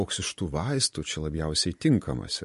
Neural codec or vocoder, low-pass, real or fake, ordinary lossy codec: none; 14.4 kHz; real; MP3, 48 kbps